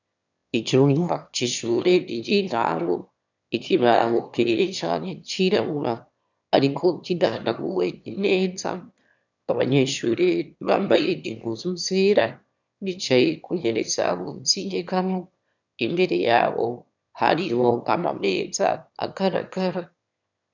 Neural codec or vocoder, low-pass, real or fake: autoencoder, 22.05 kHz, a latent of 192 numbers a frame, VITS, trained on one speaker; 7.2 kHz; fake